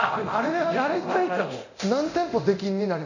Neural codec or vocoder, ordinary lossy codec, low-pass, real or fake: codec, 24 kHz, 0.9 kbps, DualCodec; none; 7.2 kHz; fake